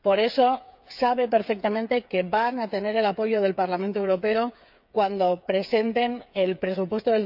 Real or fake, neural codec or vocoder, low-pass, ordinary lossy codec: fake; codec, 16 kHz, 8 kbps, FreqCodec, smaller model; 5.4 kHz; none